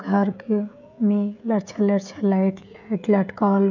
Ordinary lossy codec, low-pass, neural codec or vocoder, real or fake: none; 7.2 kHz; codec, 16 kHz, 16 kbps, FreqCodec, smaller model; fake